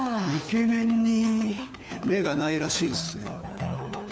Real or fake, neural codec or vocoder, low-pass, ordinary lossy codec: fake; codec, 16 kHz, 4 kbps, FunCodec, trained on LibriTTS, 50 frames a second; none; none